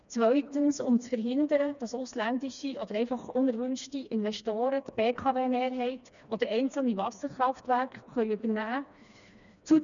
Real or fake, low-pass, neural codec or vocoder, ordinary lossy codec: fake; 7.2 kHz; codec, 16 kHz, 2 kbps, FreqCodec, smaller model; none